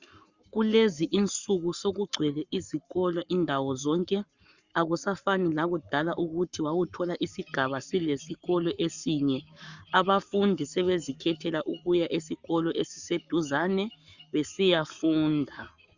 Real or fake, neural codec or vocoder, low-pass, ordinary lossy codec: fake; codec, 44.1 kHz, 7.8 kbps, Pupu-Codec; 7.2 kHz; Opus, 64 kbps